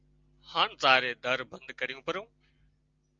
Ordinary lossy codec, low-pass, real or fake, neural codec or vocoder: Opus, 32 kbps; 7.2 kHz; real; none